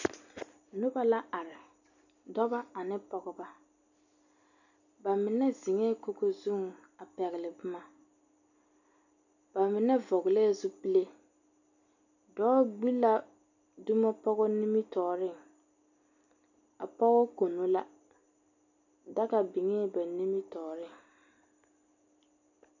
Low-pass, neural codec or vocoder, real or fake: 7.2 kHz; none; real